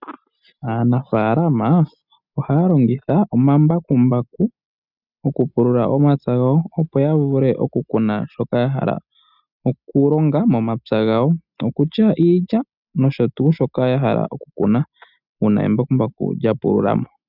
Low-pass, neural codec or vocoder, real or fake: 5.4 kHz; none; real